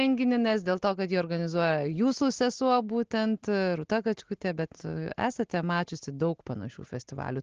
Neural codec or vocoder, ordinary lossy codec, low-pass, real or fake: none; Opus, 16 kbps; 7.2 kHz; real